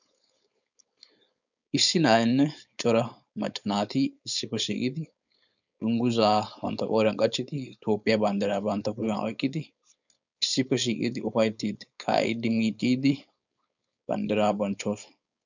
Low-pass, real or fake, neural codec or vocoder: 7.2 kHz; fake; codec, 16 kHz, 4.8 kbps, FACodec